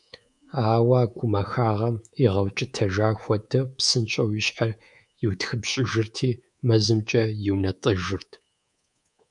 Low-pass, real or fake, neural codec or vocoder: 10.8 kHz; fake; codec, 24 kHz, 3.1 kbps, DualCodec